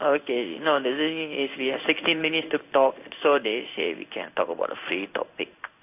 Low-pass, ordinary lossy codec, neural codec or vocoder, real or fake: 3.6 kHz; AAC, 32 kbps; codec, 16 kHz in and 24 kHz out, 1 kbps, XY-Tokenizer; fake